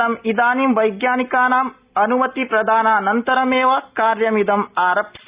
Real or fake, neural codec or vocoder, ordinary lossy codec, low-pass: real; none; Opus, 64 kbps; 3.6 kHz